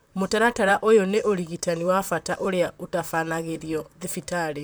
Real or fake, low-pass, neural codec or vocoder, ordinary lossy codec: fake; none; vocoder, 44.1 kHz, 128 mel bands, Pupu-Vocoder; none